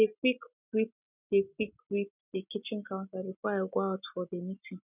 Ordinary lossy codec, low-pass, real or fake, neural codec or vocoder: none; 3.6 kHz; real; none